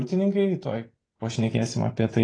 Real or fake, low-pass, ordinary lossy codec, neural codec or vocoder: real; 9.9 kHz; AAC, 32 kbps; none